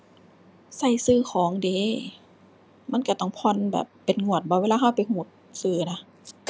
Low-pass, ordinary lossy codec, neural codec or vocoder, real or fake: none; none; none; real